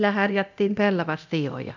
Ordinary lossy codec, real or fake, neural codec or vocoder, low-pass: none; fake; codec, 24 kHz, 0.9 kbps, DualCodec; 7.2 kHz